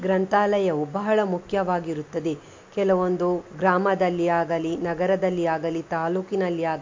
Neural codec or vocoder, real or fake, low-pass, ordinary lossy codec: none; real; 7.2 kHz; MP3, 48 kbps